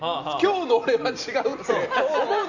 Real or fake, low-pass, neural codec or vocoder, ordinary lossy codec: real; 7.2 kHz; none; none